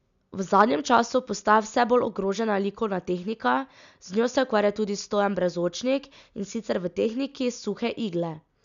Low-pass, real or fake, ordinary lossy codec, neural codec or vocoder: 7.2 kHz; real; none; none